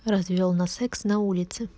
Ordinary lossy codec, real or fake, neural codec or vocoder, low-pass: none; real; none; none